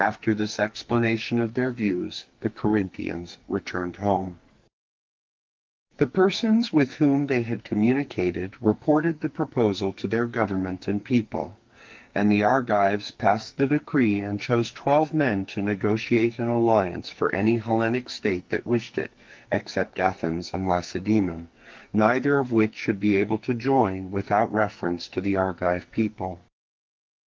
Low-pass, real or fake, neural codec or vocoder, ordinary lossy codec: 7.2 kHz; fake; codec, 44.1 kHz, 2.6 kbps, SNAC; Opus, 32 kbps